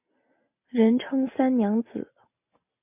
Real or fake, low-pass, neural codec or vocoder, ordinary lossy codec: real; 3.6 kHz; none; MP3, 32 kbps